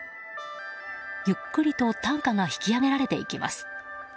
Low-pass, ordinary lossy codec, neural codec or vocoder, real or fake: none; none; none; real